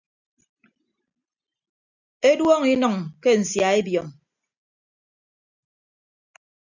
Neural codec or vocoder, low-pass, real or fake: none; 7.2 kHz; real